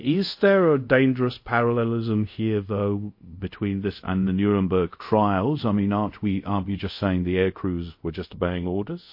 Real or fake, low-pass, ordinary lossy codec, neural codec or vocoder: fake; 5.4 kHz; MP3, 32 kbps; codec, 24 kHz, 0.5 kbps, DualCodec